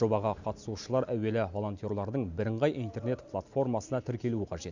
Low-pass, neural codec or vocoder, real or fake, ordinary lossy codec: 7.2 kHz; none; real; none